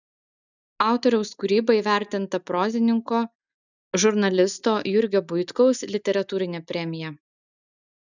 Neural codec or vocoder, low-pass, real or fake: none; 7.2 kHz; real